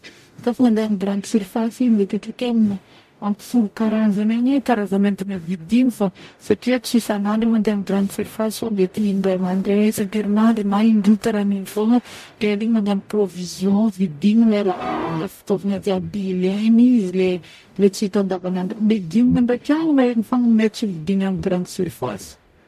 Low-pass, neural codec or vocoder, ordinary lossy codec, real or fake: 14.4 kHz; codec, 44.1 kHz, 0.9 kbps, DAC; MP3, 64 kbps; fake